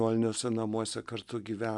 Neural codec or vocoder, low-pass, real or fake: none; 10.8 kHz; real